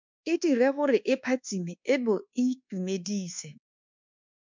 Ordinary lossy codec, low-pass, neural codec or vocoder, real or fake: MP3, 64 kbps; 7.2 kHz; codec, 24 kHz, 1.2 kbps, DualCodec; fake